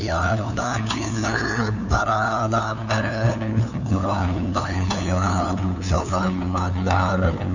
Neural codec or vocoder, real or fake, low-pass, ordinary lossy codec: codec, 16 kHz, 2 kbps, FunCodec, trained on LibriTTS, 25 frames a second; fake; 7.2 kHz; none